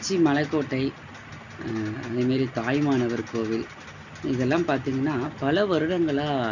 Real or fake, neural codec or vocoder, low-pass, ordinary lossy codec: real; none; 7.2 kHz; none